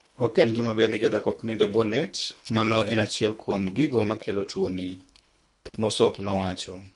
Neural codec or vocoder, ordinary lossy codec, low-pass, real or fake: codec, 24 kHz, 1.5 kbps, HILCodec; MP3, 96 kbps; 10.8 kHz; fake